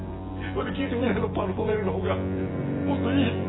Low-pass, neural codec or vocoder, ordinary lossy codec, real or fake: 7.2 kHz; vocoder, 24 kHz, 100 mel bands, Vocos; AAC, 16 kbps; fake